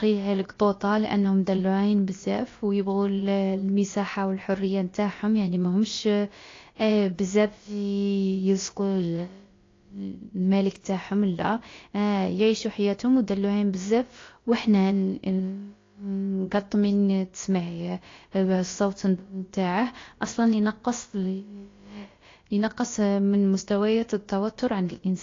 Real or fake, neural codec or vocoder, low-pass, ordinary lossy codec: fake; codec, 16 kHz, about 1 kbps, DyCAST, with the encoder's durations; 7.2 kHz; AAC, 32 kbps